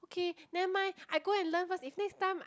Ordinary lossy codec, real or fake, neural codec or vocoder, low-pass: none; real; none; none